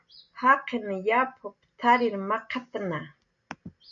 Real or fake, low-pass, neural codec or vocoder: real; 7.2 kHz; none